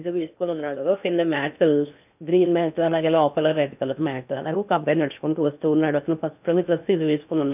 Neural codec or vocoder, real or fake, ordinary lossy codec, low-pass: codec, 16 kHz in and 24 kHz out, 0.6 kbps, FocalCodec, streaming, 4096 codes; fake; none; 3.6 kHz